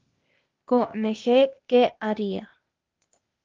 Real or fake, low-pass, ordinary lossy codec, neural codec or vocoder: fake; 7.2 kHz; Opus, 24 kbps; codec, 16 kHz, 0.8 kbps, ZipCodec